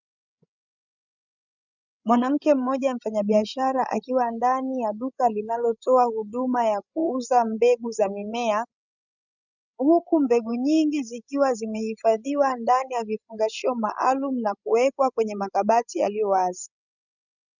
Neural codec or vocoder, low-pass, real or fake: codec, 16 kHz, 16 kbps, FreqCodec, larger model; 7.2 kHz; fake